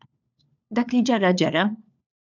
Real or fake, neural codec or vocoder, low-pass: fake; codec, 16 kHz, 4 kbps, FunCodec, trained on LibriTTS, 50 frames a second; 7.2 kHz